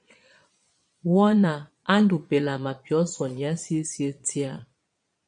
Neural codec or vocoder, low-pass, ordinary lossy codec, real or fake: vocoder, 22.05 kHz, 80 mel bands, Vocos; 9.9 kHz; MP3, 64 kbps; fake